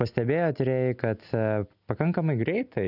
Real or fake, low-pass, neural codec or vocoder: real; 5.4 kHz; none